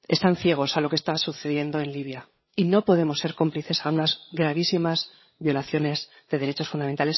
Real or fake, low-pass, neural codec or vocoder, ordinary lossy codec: fake; 7.2 kHz; codec, 16 kHz, 8 kbps, FreqCodec, larger model; MP3, 24 kbps